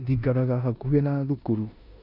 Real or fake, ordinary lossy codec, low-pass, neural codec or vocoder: fake; none; 5.4 kHz; codec, 16 kHz in and 24 kHz out, 0.9 kbps, LongCat-Audio-Codec, four codebook decoder